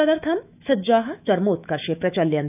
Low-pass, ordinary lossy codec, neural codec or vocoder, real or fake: 3.6 kHz; none; autoencoder, 48 kHz, 128 numbers a frame, DAC-VAE, trained on Japanese speech; fake